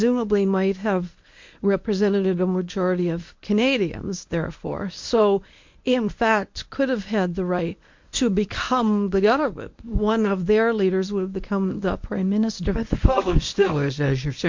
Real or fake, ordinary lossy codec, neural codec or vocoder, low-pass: fake; MP3, 48 kbps; codec, 24 kHz, 0.9 kbps, WavTokenizer, medium speech release version 1; 7.2 kHz